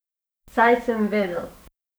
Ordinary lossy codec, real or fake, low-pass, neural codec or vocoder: none; fake; none; codec, 44.1 kHz, 7.8 kbps, DAC